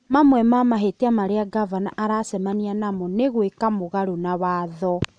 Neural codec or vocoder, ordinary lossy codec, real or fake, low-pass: none; none; real; 9.9 kHz